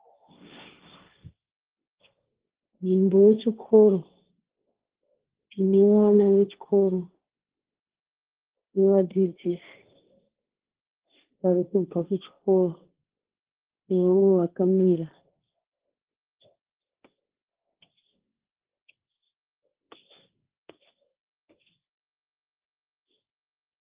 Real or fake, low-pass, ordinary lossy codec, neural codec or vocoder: fake; 3.6 kHz; Opus, 16 kbps; codec, 16 kHz, 1.1 kbps, Voila-Tokenizer